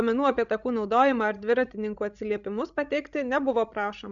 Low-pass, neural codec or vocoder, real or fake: 7.2 kHz; codec, 16 kHz, 16 kbps, FreqCodec, larger model; fake